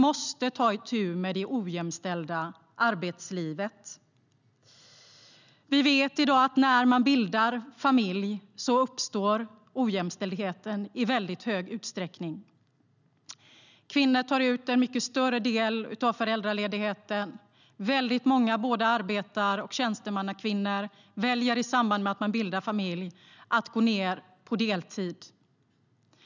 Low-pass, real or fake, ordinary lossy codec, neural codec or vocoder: 7.2 kHz; real; none; none